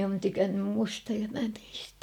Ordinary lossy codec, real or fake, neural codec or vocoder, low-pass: none; real; none; 19.8 kHz